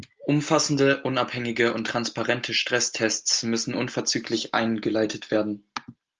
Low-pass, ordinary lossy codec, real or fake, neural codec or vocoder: 7.2 kHz; Opus, 24 kbps; real; none